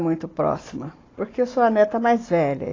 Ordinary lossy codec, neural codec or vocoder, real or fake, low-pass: AAC, 32 kbps; none; real; 7.2 kHz